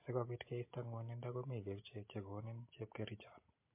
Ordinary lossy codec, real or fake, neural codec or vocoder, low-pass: MP3, 24 kbps; real; none; 3.6 kHz